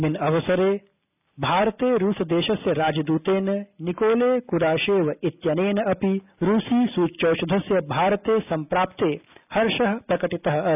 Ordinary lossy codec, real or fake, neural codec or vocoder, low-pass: none; real; none; 3.6 kHz